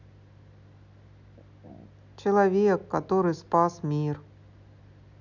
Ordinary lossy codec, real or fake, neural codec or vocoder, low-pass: none; real; none; 7.2 kHz